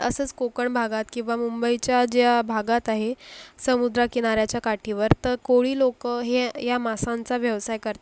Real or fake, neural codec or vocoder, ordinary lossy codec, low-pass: real; none; none; none